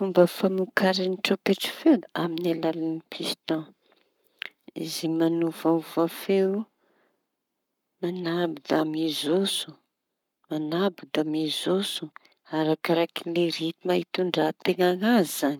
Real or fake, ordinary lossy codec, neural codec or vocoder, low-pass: fake; none; codec, 44.1 kHz, 7.8 kbps, Pupu-Codec; 19.8 kHz